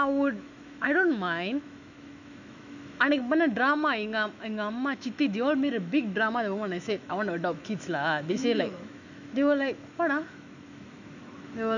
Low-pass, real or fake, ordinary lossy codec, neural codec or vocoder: 7.2 kHz; fake; none; autoencoder, 48 kHz, 128 numbers a frame, DAC-VAE, trained on Japanese speech